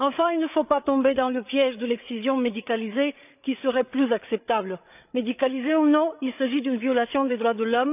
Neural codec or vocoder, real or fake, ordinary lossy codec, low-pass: codec, 16 kHz, 8 kbps, FreqCodec, larger model; fake; none; 3.6 kHz